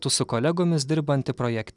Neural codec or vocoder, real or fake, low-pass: none; real; 10.8 kHz